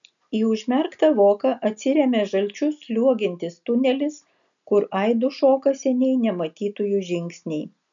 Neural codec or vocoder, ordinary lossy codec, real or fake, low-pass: none; MP3, 96 kbps; real; 7.2 kHz